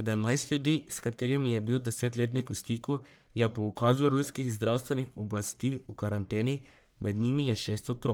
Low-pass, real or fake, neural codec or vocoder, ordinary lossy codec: none; fake; codec, 44.1 kHz, 1.7 kbps, Pupu-Codec; none